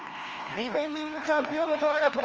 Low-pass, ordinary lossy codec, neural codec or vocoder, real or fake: 7.2 kHz; Opus, 24 kbps; codec, 16 kHz, 1 kbps, FunCodec, trained on LibriTTS, 50 frames a second; fake